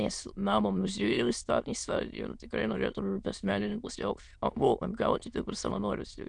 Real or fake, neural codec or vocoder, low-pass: fake; autoencoder, 22.05 kHz, a latent of 192 numbers a frame, VITS, trained on many speakers; 9.9 kHz